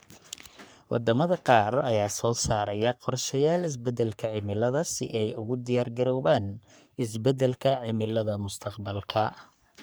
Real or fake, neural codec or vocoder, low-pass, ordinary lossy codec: fake; codec, 44.1 kHz, 3.4 kbps, Pupu-Codec; none; none